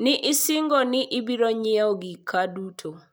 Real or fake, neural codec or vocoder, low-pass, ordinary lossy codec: real; none; none; none